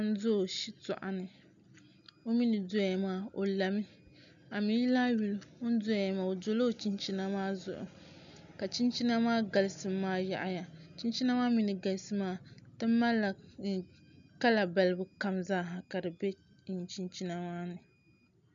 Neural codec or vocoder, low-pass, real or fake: none; 7.2 kHz; real